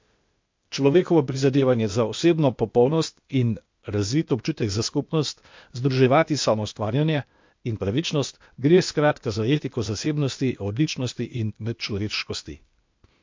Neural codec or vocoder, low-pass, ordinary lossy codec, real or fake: codec, 16 kHz, 0.8 kbps, ZipCodec; 7.2 kHz; MP3, 48 kbps; fake